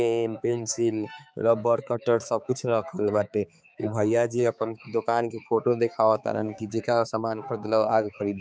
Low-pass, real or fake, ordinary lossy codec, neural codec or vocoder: none; fake; none; codec, 16 kHz, 4 kbps, X-Codec, HuBERT features, trained on balanced general audio